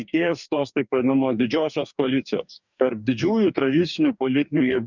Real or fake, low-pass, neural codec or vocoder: fake; 7.2 kHz; codec, 44.1 kHz, 2.6 kbps, DAC